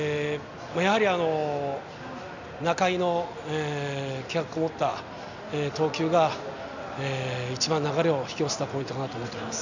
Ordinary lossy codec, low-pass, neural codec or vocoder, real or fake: none; 7.2 kHz; none; real